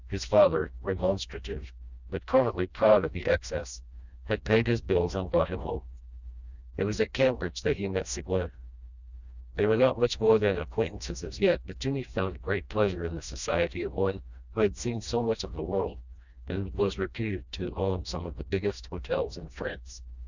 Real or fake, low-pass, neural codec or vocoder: fake; 7.2 kHz; codec, 16 kHz, 1 kbps, FreqCodec, smaller model